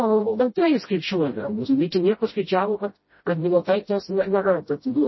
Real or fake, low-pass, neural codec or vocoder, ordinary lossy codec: fake; 7.2 kHz; codec, 16 kHz, 0.5 kbps, FreqCodec, smaller model; MP3, 24 kbps